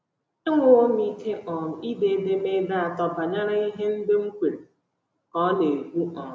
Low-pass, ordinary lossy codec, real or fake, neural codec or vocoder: none; none; real; none